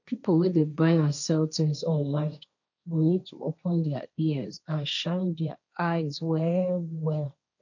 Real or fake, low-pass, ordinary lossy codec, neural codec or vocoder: fake; 7.2 kHz; none; codec, 16 kHz, 1.1 kbps, Voila-Tokenizer